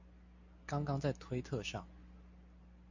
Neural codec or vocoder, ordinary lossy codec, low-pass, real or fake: none; AAC, 48 kbps; 7.2 kHz; real